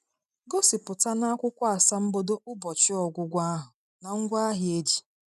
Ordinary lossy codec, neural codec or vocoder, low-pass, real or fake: none; none; 14.4 kHz; real